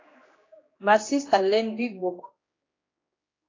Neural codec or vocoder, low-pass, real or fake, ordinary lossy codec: codec, 16 kHz, 2 kbps, X-Codec, HuBERT features, trained on general audio; 7.2 kHz; fake; AAC, 32 kbps